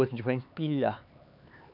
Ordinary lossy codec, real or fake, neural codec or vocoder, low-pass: none; fake; codec, 16 kHz, 4 kbps, X-Codec, HuBERT features, trained on LibriSpeech; 5.4 kHz